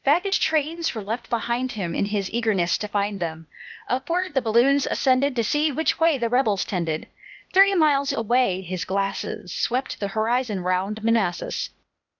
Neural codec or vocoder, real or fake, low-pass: codec, 16 kHz, 0.8 kbps, ZipCodec; fake; 7.2 kHz